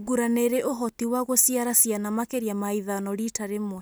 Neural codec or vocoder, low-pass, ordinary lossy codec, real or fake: none; none; none; real